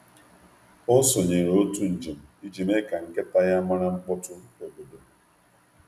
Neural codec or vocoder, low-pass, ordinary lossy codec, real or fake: none; 14.4 kHz; none; real